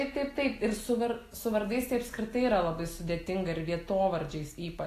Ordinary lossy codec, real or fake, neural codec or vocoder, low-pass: AAC, 48 kbps; real; none; 14.4 kHz